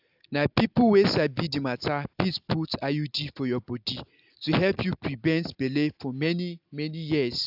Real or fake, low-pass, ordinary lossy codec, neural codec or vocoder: real; 5.4 kHz; AAC, 48 kbps; none